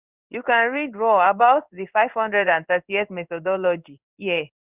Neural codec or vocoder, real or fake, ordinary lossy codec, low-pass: codec, 16 kHz in and 24 kHz out, 1 kbps, XY-Tokenizer; fake; Opus, 64 kbps; 3.6 kHz